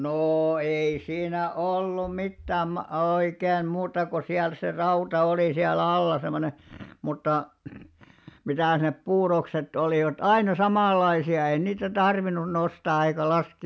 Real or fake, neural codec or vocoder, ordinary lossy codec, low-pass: real; none; none; none